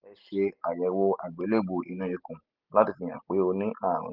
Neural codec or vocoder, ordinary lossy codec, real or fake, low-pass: none; Opus, 32 kbps; real; 5.4 kHz